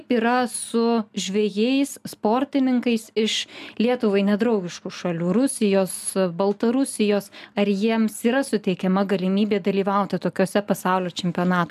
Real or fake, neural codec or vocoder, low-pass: real; none; 14.4 kHz